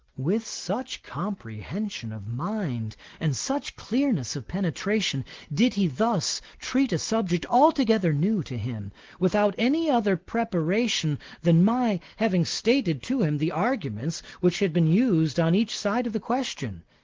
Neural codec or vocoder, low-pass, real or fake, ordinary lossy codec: none; 7.2 kHz; real; Opus, 16 kbps